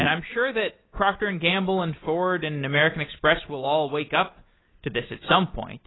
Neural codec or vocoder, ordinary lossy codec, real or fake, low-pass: none; AAC, 16 kbps; real; 7.2 kHz